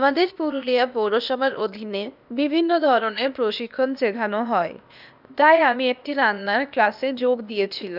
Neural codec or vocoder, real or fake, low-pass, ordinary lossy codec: codec, 16 kHz, 0.8 kbps, ZipCodec; fake; 5.4 kHz; none